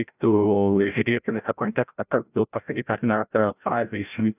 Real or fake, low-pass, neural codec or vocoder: fake; 3.6 kHz; codec, 16 kHz, 0.5 kbps, FreqCodec, larger model